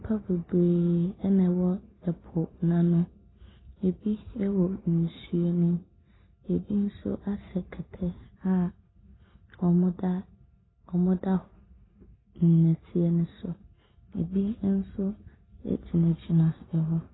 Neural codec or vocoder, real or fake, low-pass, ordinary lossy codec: none; real; 7.2 kHz; AAC, 16 kbps